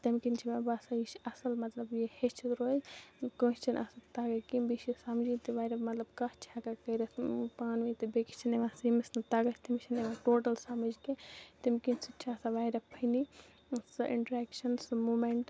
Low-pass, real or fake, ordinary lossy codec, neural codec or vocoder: none; real; none; none